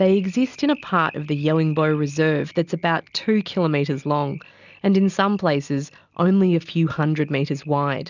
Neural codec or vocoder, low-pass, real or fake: none; 7.2 kHz; real